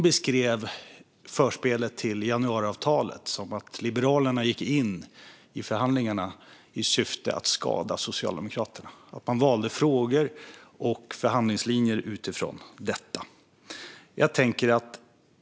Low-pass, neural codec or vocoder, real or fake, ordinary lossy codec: none; none; real; none